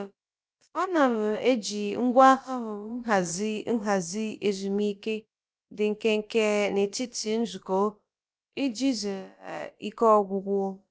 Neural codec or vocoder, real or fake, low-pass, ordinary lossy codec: codec, 16 kHz, about 1 kbps, DyCAST, with the encoder's durations; fake; none; none